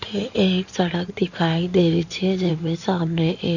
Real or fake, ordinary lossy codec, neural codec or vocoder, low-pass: fake; none; codec, 16 kHz in and 24 kHz out, 2.2 kbps, FireRedTTS-2 codec; 7.2 kHz